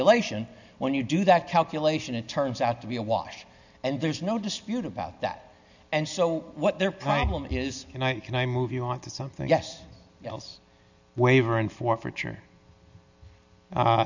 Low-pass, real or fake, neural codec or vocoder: 7.2 kHz; real; none